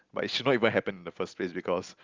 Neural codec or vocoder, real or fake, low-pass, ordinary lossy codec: none; real; 7.2 kHz; Opus, 24 kbps